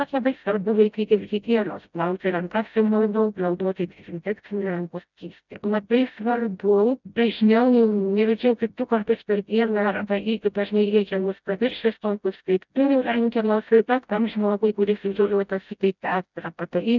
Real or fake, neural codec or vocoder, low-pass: fake; codec, 16 kHz, 0.5 kbps, FreqCodec, smaller model; 7.2 kHz